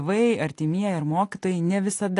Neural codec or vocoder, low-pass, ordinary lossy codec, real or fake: none; 10.8 kHz; AAC, 48 kbps; real